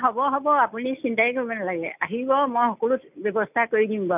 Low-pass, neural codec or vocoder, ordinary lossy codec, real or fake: 3.6 kHz; none; none; real